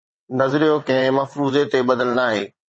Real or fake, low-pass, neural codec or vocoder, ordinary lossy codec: fake; 9.9 kHz; vocoder, 22.05 kHz, 80 mel bands, WaveNeXt; MP3, 32 kbps